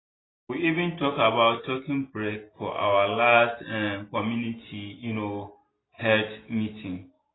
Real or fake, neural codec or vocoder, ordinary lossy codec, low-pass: real; none; AAC, 16 kbps; 7.2 kHz